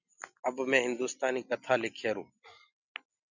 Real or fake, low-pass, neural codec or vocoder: real; 7.2 kHz; none